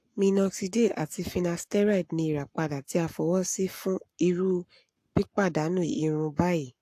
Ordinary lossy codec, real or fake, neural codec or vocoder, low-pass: AAC, 64 kbps; fake; codec, 44.1 kHz, 7.8 kbps, Pupu-Codec; 14.4 kHz